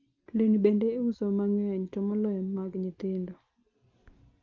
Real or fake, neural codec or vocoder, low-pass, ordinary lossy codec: real; none; 7.2 kHz; Opus, 24 kbps